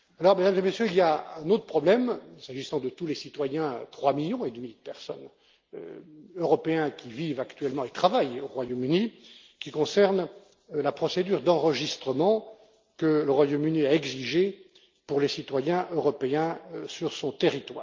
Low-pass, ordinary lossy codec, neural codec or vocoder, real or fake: 7.2 kHz; Opus, 32 kbps; none; real